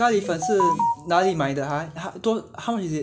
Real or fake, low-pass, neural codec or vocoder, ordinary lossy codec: real; none; none; none